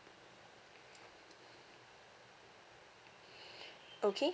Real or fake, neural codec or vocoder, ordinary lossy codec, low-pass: real; none; none; none